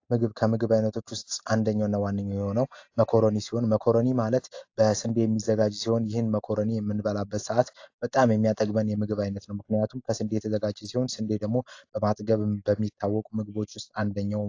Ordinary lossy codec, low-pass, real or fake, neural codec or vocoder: AAC, 48 kbps; 7.2 kHz; real; none